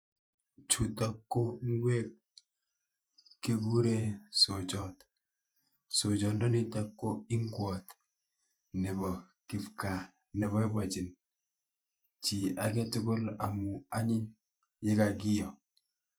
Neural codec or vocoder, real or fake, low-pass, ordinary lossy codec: vocoder, 44.1 kHz, 128 mel bands every 512 samples, BigVGAN v2; fake; none; none